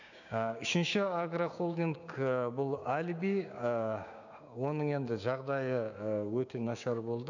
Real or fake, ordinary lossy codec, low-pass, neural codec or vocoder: fake; none; 7.2 kHz; codec, 16 kHz, 6 kbps, DAC